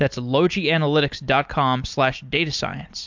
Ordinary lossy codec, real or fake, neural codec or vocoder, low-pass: MP3, 64 kbps; real; none; 7.2 kHz